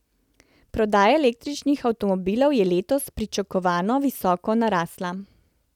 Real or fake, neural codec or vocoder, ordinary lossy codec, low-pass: real; none; none; 19.8 kHz